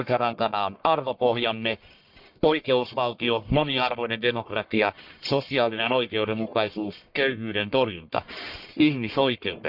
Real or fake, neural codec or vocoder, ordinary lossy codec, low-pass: fake; codec, 44.1 kHz, 1.7 kbps, Pupu-Codec; none; 5.4 kHz